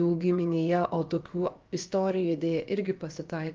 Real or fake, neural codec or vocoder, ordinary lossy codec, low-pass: fake; codec, 16 kHz, about 1 kbps, DyCAST, with the encoder's durations; Opus, 24 kbps; 7.2 kHz